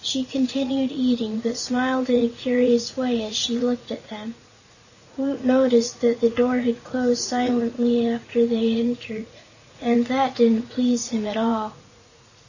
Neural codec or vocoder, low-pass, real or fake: vocoder, 44.1 kHz, 80 mel bands, Vocos; 7.2 kHz; fake